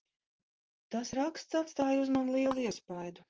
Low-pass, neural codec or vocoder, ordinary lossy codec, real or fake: 7.2 kHz; vocoder, 44.1 kHz, 128 mel bands, Pupu-Vocoder; Opus, 24 kbps; fake